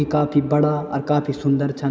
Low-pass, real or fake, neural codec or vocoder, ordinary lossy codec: none; real; none; none